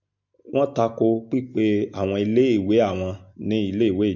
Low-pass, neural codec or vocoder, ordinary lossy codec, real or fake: 7.2 kHz; none; MP3, 48 kbps; real